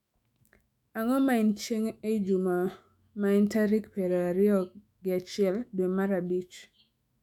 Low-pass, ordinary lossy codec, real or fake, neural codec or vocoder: 19.8 kHz; none; fake; autoencoder, 48 kHz, 128 numbers a frame, DAC-VAE, trained on Japanese speech